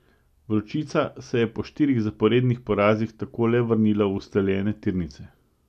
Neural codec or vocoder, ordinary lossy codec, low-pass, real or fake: none; none; 14.4 kHz; real